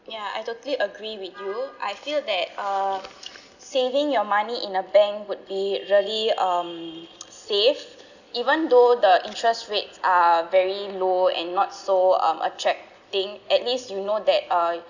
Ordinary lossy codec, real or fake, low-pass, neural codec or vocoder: none; real; 7.2 kHz; none